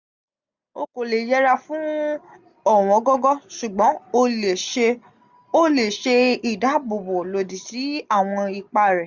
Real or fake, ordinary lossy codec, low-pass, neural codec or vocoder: real; none; 7.2 kHz; none